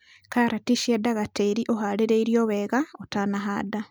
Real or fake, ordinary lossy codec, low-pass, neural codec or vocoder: real; none; none; none